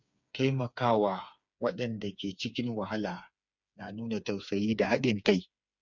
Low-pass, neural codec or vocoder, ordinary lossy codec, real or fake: 7.2 kHz; codec, 16 kHz, 4 kbps, FreqCodec, smaller model; none; fake